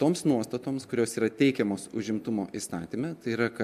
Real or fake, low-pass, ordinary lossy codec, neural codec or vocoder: real; 14.4 kHz; MP3, 96 kbps; none